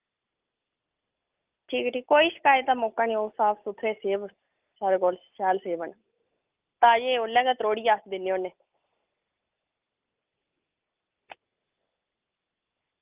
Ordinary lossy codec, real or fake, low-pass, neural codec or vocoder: Opus, 24 kbps; real; 3.6 kHz; none